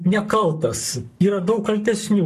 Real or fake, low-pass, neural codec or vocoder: fake; 14.4 kHz; codec, 44.1 kHz, 7.8 kbps, Pupu-Codec